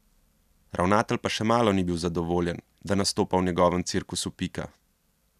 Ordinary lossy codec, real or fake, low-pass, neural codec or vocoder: none; real; 14.4 kHz; none